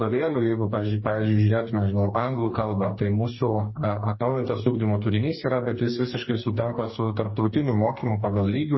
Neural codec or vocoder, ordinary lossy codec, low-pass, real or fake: codec, 44.1 kHz, 2.6 kbps, DAC; MP3, 24 kbps; 7.2 kHz; fake